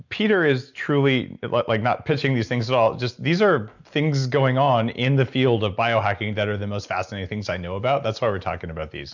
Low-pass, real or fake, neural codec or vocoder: 7.2 kHz; real; none